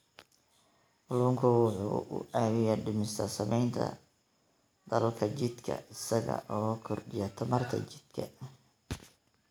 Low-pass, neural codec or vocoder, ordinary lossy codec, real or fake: none; none; none; real